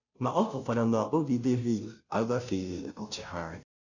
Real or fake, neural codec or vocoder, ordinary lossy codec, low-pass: fake; codec, 16 kHz, 0.5 kbps, FunCodec, trained on Chinese and English, 25 frames a second; none; 7.2 kHz